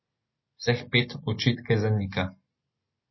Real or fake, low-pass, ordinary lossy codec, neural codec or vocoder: real; 7.2 kHz; MP3, 24 kbps; none